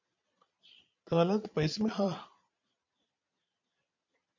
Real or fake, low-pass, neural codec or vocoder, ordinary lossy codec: fake; 7.2 kHz; vocoder, 22.05 kHz, 80 mel bands, Vocos; MP3, 64 kbps